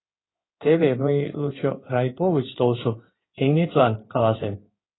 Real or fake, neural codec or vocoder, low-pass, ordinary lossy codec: fake; codec, 16 kHz in and 24 kHz out, 2.2 kbps, FireRedTTS-2 codec; 7.2 kHz; AAC, 16 kbps